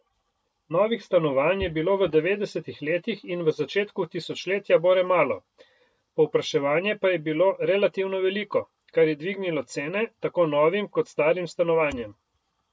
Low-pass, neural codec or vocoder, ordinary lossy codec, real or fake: none; none; none; real